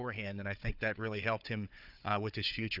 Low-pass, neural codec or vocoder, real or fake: 5.4 kHz; codec, 16 kHz, 4 kbps, FunCodec, trained on Chinese and English, 50 frames a second; fake